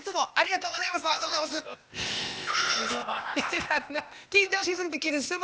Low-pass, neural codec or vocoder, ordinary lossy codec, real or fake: none; codec, 16 kHz, 0.8 kbps, ZipCodec; none; fake